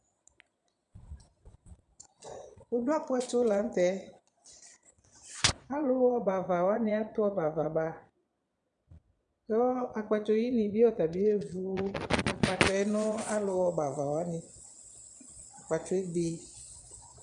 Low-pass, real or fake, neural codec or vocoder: 9.9 kHz; fake; vocoder, 22.05 kHz, 80 mel bands, Vocos